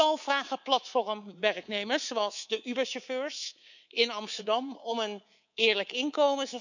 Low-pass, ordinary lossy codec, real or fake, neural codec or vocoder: 7.2 kHz; none; fake; codec, 24 kHz, 3.1 kbps, DualCodec